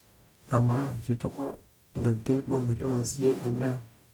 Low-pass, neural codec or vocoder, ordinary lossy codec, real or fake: 19.8 kHz; codec, 44.1 kHz, 0.9 kbps, DAC; none; fake